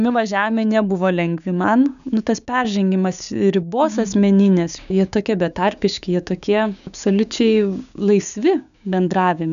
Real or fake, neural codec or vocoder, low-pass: fake; codec, 16 kHz, 6 kbps, DAC; 7.2 kHz